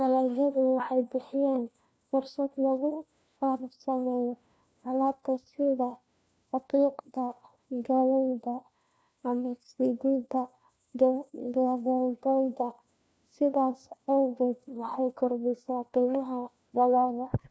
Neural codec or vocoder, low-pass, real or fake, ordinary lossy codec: codec, 16 kHz, 1 kbps, FunCodec, trained on LibriTTS, 50 frames a second; none; fake; none